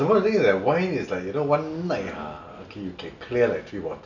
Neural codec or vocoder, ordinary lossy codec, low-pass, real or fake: none; none; 7.2 kHz; real